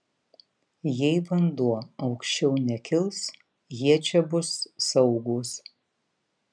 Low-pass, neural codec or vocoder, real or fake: 9.9 kHz; none; real